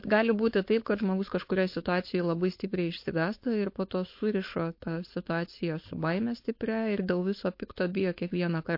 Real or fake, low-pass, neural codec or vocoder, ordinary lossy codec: fake; 5.4 kHz; codec, 16 kHz, 4.8 kbps, FACodec; MP3, 32 kbps